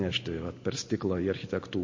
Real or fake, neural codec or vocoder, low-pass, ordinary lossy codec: real; none; 7.2 kHz; MP3, 32 kbps